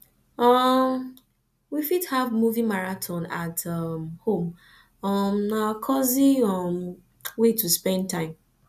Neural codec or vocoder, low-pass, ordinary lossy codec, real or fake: none; 14.4 kHz; none; real